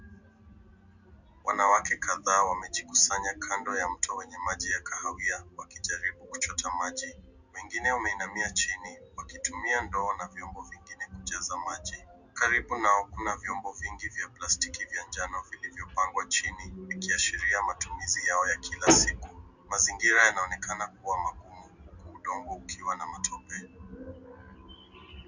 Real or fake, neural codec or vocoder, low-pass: real; none; 7.2 kHz